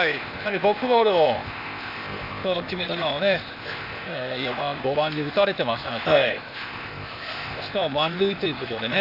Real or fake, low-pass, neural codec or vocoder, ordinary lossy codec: fake; 5.4 kHz; codec, 16 kHz, 0.8 kbps, ZipCodec; none